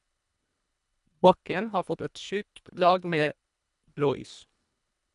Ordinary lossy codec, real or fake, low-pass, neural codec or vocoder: none; fake; 10.8 kHz; codec, 24 kHz, 1.5 kbps, HILCodec